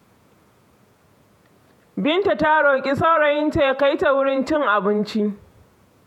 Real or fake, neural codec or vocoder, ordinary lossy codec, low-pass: fake; vocoder, 48 kHz, 128 mel bands, Vocos; none; 19.8 kHz